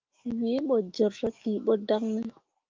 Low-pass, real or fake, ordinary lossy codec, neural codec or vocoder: 7.2 kHz; fake; Opus, 32 kbps; autoencoder, 48 kHz, 128 numbers a frame, DAC-VAE, trained on Japanese speech